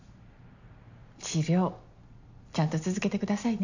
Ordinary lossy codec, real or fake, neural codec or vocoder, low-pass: none; real; none; 7.2 kHz